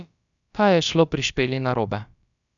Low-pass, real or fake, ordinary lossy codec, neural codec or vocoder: 7.2 kHz; fake; none; codec, 16 kHz, about 1 kbps, DyCAST, with the encoder's durations